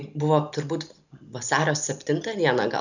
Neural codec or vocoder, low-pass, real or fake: none; 7.2 kHz; real